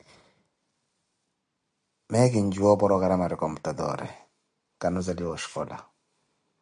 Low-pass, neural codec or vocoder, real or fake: 9.9 kHz; none; real